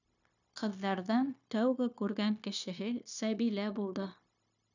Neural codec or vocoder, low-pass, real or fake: codec, 16 kHz, 0.9 kbps, LongCat-Audio-Codec; 7.2 kHz; fake